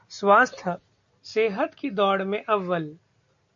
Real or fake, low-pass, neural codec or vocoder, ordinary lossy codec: real; 7.2 kHz; none; AAC, 64 kbps